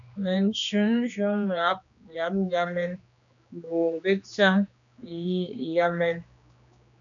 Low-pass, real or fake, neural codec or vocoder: 7.2 kHz; fake; codec, 16 kHz, 2 kbps, X-Codec, HuBERT features, trained on general audio